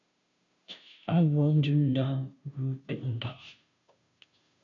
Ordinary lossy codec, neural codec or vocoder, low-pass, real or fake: MP3, 64 kbps; codec, 16 kHz, 0.5 kbps, FunCodec, trained on Chinese and English, 25 frames a second; 7.2 kHz; fake